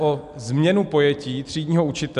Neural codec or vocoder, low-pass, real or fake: none; 10.8 kHz; real